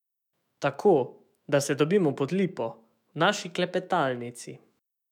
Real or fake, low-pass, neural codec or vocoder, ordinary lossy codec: fake; 19.8 kHz; autoencoder, 48 kHz, 128 numbers a frame, DAC-VAE, trained on Japanese speech; none